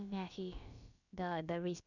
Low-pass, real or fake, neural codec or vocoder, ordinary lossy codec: 7.2 kHz; fake; codec, 16 kHz, about 1 kbps, DyCAST, with the encoder's durations; none